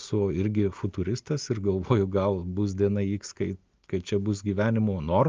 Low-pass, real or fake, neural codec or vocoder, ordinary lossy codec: 7.2 kHz; real; none; Opus, 24 kbps